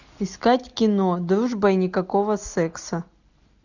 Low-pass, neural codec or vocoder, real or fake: 7.2 kHz; none; real